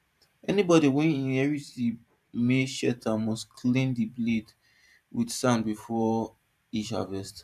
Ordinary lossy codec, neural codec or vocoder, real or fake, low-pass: none; vocoder, 44.1 kHz, 128 mel bands every 512 samples, BigVGAN v2; fake; 14.4 kHz